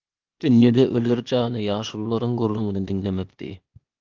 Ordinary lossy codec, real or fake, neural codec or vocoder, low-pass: Opus, 24 kbps; fake; codec, 16 kHz, 0.8 kbps, ZipCodec; 7.2 kHz